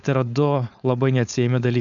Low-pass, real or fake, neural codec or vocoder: 7.2 kHz; fake; codec, 16 kHz, 4.8 kbps, FACodec